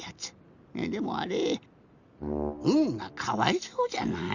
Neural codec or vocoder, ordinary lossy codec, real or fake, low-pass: none; Opus, 64 kbps; real; 7.2 kHz